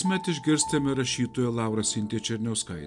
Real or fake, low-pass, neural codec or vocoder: real; 10.8 kHz; none